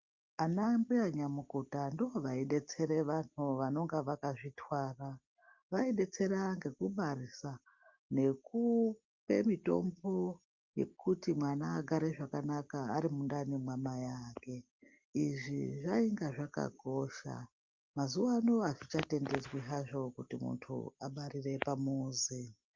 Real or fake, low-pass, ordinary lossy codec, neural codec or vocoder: real; 7.2 kHz; Opus, 24 kbps; none